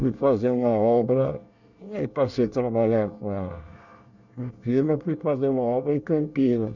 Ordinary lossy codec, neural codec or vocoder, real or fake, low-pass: none; codec, 24 kHz, 1 kbps, SNAC; fake; 7.2 kHz